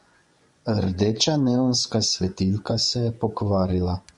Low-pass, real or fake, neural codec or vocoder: 10.8 kHz; fake; vocoder, 24 kHz, 100 mel bands, Vocos